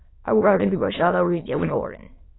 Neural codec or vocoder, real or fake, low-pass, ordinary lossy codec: autoencoder, 22.05 kHz, a latent of 192 numbers a frame, VITS, trained on many speakers; fake; 7.2 kHz; AAC, 16 kbps